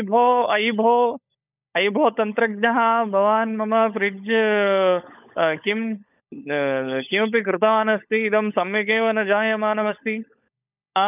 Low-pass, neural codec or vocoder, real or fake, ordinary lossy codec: 3.6 kHz; codec, 16 kHz, 16 kbps, FunCodec, trained on LibriTTS, 50 frames a second; fake; none